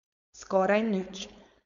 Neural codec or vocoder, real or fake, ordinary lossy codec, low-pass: codec, 16 kHz, 4.8 kbps, FACodec; fake; none; 7.2 kHz